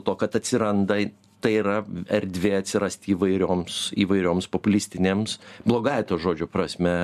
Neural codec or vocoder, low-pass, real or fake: none; 14.4 kHz; real